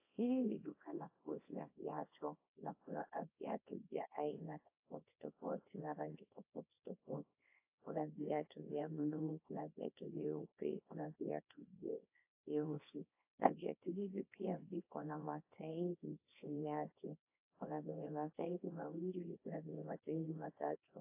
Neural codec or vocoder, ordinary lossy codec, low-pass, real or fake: codec, 24 kHz, 0.9 kbps, WavTokenizer, small release; AAC, 32 kbps; 3.6 kHz; fake